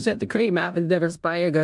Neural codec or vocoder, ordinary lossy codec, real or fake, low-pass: codec, 16 kHz in and 24 kHz out, 0.9 kbps, LongCat-Audio-Codec, four codebook decoder; MP3, 64 kbps; fake; 10.8 kHz